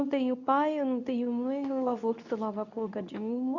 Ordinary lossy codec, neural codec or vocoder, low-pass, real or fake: none; codec, 24 kHz, 0.9 kbps, WavTokenizer, medium speech release version 2; 7.2 kHz; fake